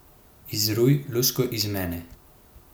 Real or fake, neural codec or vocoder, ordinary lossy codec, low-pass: real; none; none; none